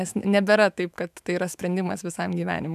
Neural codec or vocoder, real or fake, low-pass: autoencoder, 48 kHz, 128 numbers a frame, DAC-VAE, trained on Japanese speech; fake; 14.4 kHz